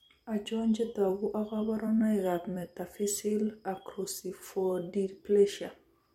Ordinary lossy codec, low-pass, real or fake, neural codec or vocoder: MP3, 64 kbps; 19.8 kHz; fake; vocoder, 44.1 kHz, 128 mel bands every 256 samples, BigVGAN v2